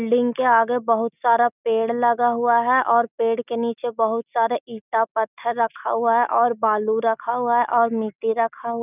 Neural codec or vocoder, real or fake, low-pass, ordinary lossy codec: none; real; 3.6 kHz; none